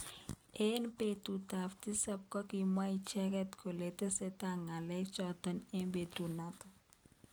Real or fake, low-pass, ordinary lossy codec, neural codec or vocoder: real; none; none; none